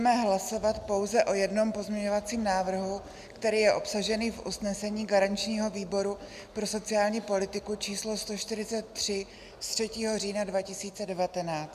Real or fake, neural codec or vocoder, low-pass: real; none; 14.4 kHz